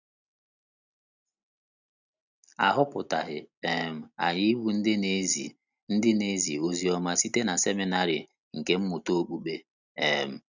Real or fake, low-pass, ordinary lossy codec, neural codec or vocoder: real; 7.2 kHz; none; none